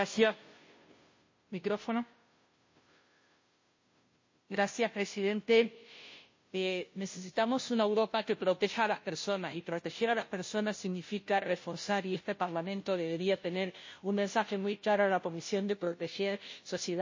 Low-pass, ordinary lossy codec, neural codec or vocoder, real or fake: 7.2 kHz; MP3, 32 kbps; codec, 16 kHz, 0.5 kbps, FunCodec, trained on Chinese and English, 25 frames a second; fake